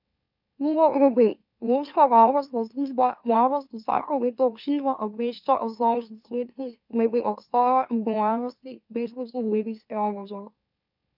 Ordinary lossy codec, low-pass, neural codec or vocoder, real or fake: none; 5.4 kHz; autoencoder, 44.1 kHz, a latent of 192 numbers a frame, MeloTTS; fake